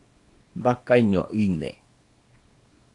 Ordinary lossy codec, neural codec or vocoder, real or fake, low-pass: AAC, 48 kbps; codec, 24 kHz, 0.9 kbps, WavTokenizer, small release; fake; 10.8 kHz